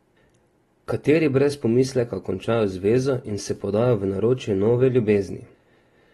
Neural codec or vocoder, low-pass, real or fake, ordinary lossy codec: none; 19.8 kHz; real; AAC, 32 kbps